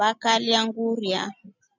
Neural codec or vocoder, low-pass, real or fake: none; 7.2 kHz; real